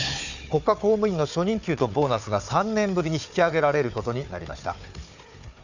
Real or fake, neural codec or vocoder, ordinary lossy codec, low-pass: fake; codec, 16 kHz, 4 kbps, FunCodec, trained on Chinese and English, 50 frames a second; none; 7.2 kHz